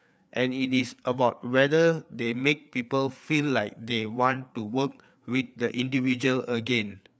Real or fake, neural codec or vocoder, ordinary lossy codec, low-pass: fake; codec, 16 kHz, 4 kbps, FreqCodec, larger model; none; none